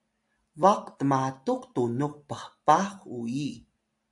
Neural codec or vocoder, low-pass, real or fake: none; 10.8 kHz; real